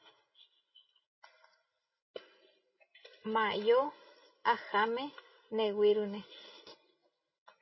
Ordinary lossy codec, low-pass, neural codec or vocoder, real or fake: MP3, 24 kbps; 7.2 kHz; none; real